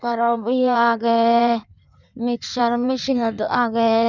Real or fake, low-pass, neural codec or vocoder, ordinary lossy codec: fake; 7.2 kHz; codec, 16 kHz in and 24 kHz out, 1.1 kbps, FireRedTTS-2 codec; none